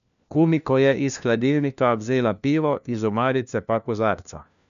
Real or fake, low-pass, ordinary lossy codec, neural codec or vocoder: fake; 7.2 kHz; none; codec, 16 kHz, 1 kbps, FunCodec, trained on LibriTTS, 50 frames a second